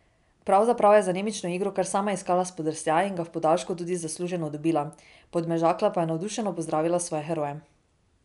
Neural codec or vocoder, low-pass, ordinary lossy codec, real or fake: none; 10.8 kHz; none; real